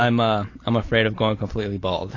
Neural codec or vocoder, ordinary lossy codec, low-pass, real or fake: none; AAC, 32 kbps; 7.2 kHz; real